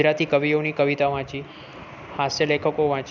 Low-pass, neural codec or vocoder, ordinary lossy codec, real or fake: 7.2 kHz; none; none; real